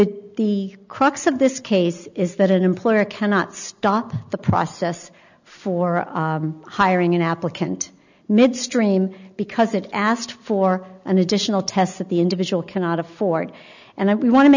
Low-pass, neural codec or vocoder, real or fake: 7.2 kHz; none; real